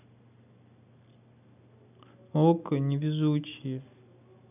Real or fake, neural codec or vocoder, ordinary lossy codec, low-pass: real; none; none; 3.6 kHz